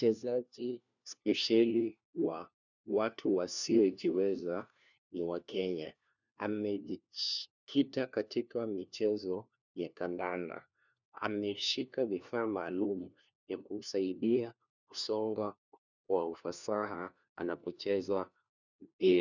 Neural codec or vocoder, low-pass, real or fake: codec, 16 kHz, 1 kbps, FunCodec, trained on LibriTTS, 50 frames a second; 7.2 kHz; fake